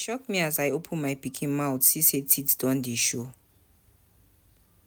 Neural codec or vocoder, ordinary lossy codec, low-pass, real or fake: none; none; none; real